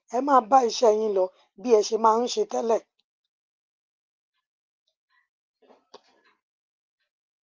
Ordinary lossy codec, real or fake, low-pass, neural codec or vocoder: Opus, 24 kbps; real; 7.2 kHz; none